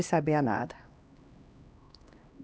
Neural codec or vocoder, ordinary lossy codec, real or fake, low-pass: codec, 16 kHz, 1 kbps, X-Codec, HuBERT features, trained on LibriSpeech; none; fake; none